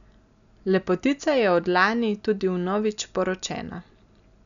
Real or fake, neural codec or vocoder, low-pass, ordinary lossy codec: real; none; 7.2 kHz; none